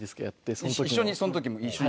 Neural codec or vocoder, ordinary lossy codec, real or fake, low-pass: none; none; real; none